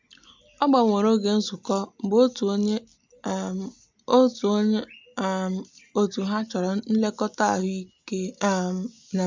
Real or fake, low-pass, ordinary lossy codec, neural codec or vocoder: real; 7.2 kHz; MP3, 64 kbps; none